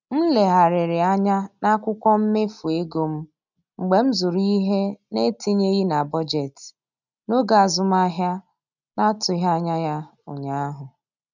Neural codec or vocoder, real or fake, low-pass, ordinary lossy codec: none; real; 7.2 kHz; none